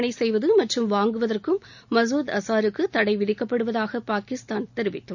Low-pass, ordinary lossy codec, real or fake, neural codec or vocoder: 7.2 kHz; none; real; none